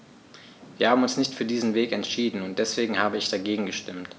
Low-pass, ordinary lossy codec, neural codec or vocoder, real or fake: none; none; none; real